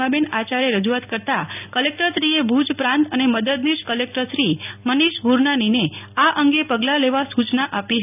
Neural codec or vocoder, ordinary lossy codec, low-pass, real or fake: none; none; 3.6 kHz; real